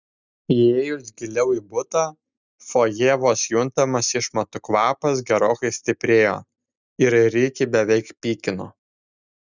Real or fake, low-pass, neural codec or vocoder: real; 7.2 kHz; none